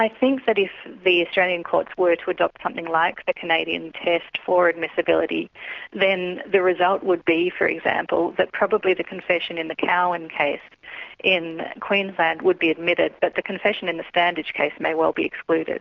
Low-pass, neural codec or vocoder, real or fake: 7.2 kHz; none; real